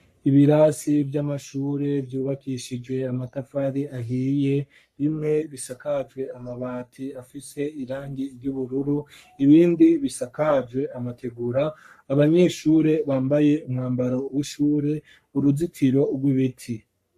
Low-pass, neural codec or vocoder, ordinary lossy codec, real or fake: 14.4 kHz; codec, 44.1 kHz, 3.4 kbps, Pupu-Codec; AAC, 96 kbps; fake